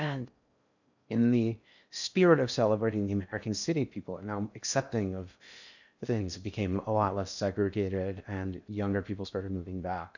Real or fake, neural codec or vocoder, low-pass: fake; codec, 16 kHz in and 24 kHz out, 0.6 kbps, FocalCodec, streaming, 4096 codes; 7.2 kHz